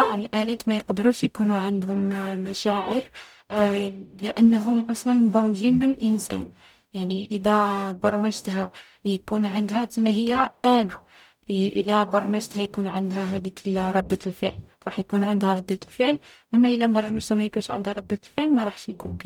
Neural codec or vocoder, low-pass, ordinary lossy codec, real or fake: codec, 44.1 kHz, 0.9 kbps, DAC; 19.8 kHz; none; fake